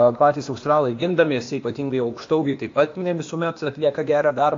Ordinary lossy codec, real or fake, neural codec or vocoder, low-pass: AAC, 48 kbps; fake; codec, 16 kHz, 0.8 kbps, ZipCodec; 7.2 kHz